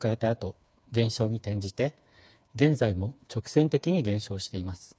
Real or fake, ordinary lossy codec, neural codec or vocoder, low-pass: fake; none; codec, 16 kHz, 4 kbps, FreqCodec, smaller model; none